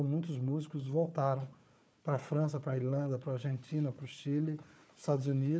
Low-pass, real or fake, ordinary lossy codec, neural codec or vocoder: none; fake; none; codec, 16 kHz, 4 kbps, FunCodec, trained on Chinese and English, 50 frames a second